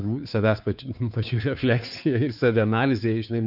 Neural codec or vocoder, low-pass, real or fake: codec, 16 kHz, 2 kbps, FunCodec, trained on Chinese and English, 25 frames a second; 5.4 kHz; fake